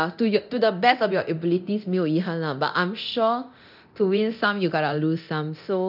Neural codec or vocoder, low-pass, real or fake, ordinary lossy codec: codec, 24 kHz, 0.9 kbps, DualCodec; 5.4 kHz; fake; none